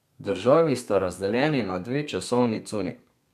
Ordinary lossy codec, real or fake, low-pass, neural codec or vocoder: none; fake; 14.4 kHz; codec, 32 kHz, 1.9 kbps, SNAC